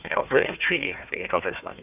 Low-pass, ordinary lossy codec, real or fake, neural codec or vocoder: 3.6 kHz; none; fake; codec, 16 kHz, 1 kbps, FunCodec, trained on Chinese and English, 50 frames a second